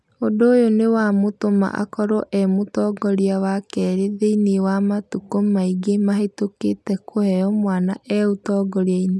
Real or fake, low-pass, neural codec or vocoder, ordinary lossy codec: real; none; none; none